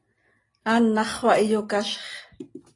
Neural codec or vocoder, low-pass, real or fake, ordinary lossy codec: none; 10.8 kHz; real; AAC, 32 kbps